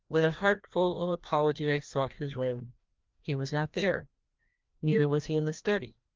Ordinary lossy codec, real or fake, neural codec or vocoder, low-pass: Opus, 24 kbps; fake; codec, 16 kHz, 1 kbps, FreqCodec, larger model; 7.2 kHz